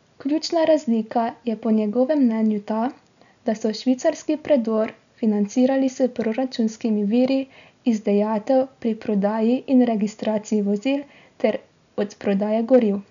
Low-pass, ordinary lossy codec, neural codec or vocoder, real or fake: 7.2 kHz; none; none; real